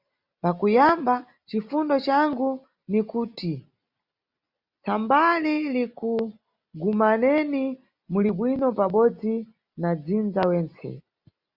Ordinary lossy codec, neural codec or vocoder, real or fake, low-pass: Opus, 64 kbps; none; real; 5.4 kHz